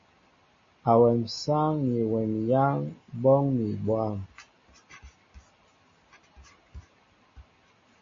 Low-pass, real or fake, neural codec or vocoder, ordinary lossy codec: 7.2 kHz; real; none; MP3, 32 kbps